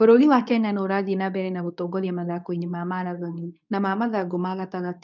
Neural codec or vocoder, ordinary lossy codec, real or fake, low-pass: codec, 24 kHz, 0.9 kbps, WavTokenizer, medium speech release version 2; none; fake; 7.2 kHz